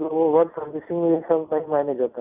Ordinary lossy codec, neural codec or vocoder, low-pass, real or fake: none; none; 3.6 kHz; real